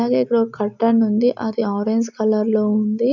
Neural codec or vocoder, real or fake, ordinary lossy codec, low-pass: none; real; none; 7.2 kHz